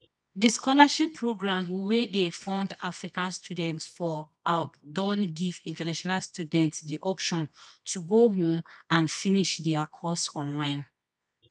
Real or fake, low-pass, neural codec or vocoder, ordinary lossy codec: fake; none; codec, 24 kHz, 0.9 kbps, WavTokenizer, medium music audio release; none